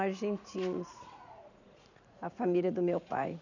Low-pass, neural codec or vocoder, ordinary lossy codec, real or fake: 7.2 kHz; vocoder, 44.1 kHz, 80 mel bands, Vocos; none; fake